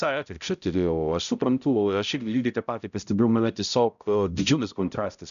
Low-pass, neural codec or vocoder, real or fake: 7.2 kHz; codec, 16 kHz, 0.5 kbps, X-Codec, HuBERT features, trained on balanced general audio; fake